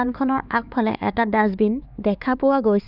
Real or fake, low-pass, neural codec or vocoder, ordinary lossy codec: fake; 5.4 kHz; codec, 16 kHz, 4 kbps, X-Codec, HuBERT features, trained on LibriSpeech; none